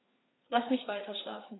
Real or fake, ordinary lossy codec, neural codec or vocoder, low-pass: fake; AAC, 16 kbps; codec, 16 kHz, 4 kbps, FreqCodec, larger model; 7.2 kHz